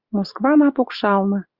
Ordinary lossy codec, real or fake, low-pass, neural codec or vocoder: Opus, 64 kbps; fake; 5.4 kHz; vocoder, 44.1 kHz, 128 mel bands every 512 samples, BigVGAN v2